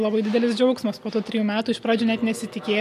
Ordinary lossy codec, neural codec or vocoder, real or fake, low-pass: MP3, 64 kbps; none; real; 14.4 kHz